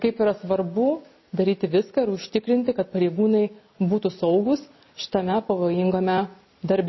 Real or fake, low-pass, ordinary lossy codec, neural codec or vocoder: real; 7.2 kHz; MP3, 24 kbps; none